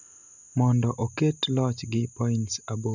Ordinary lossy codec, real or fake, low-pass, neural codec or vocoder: none; real; 7.2 kHz; none